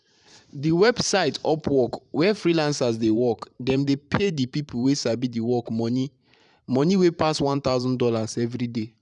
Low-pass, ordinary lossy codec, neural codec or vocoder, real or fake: 10.8 kHz; none; vocoder, 44.1 kHz, 128 mel bands every 512 samples, BigVGAN v2; fake